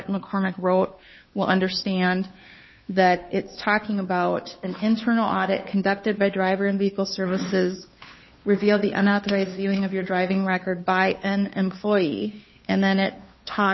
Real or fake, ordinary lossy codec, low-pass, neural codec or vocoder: fake; MP3, 24 kbps; 7.2 kHz; codec, 16 kHz, 2 kbps, FunCodec, trained on Chinese and English, 25 frames a second